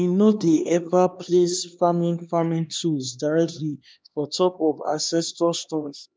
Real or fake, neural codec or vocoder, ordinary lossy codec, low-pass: fake; codec, 16 kHz, 2 kbps, X-Codec, HuBERT features, trained on LibriSpeech; none; none